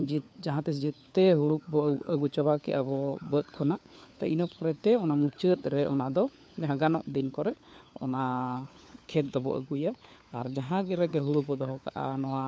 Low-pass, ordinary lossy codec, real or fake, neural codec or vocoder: none; none; fake; codec, 16 kHz, 4 kbps, FunCodec, trained on LibriTTS, 50 frames a second